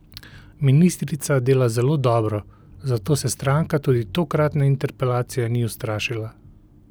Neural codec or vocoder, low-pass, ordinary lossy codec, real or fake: none; none; none; real